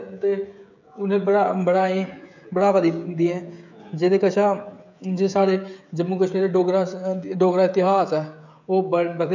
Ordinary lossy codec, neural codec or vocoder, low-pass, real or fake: none; codec, 16 kHz, 16 kbps, FreqCodec, smaller model; 7.2 kHz; fake